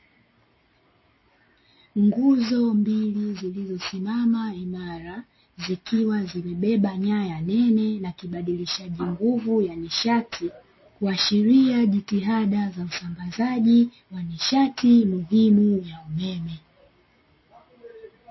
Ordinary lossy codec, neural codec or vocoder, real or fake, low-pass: MP3, 24 kbps; none; real; 7.2 kHz